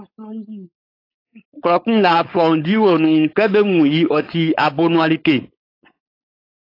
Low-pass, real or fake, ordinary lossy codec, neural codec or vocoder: 5.4 kHz; fake; AAC, 32 kbps; codec, 16 kHz, 4.8 kbps, FACodec